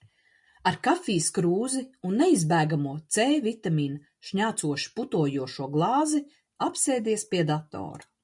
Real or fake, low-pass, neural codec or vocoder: real; 10.8 kHz; none